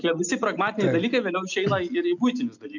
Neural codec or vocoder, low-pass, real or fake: none; 7.2 kHz; real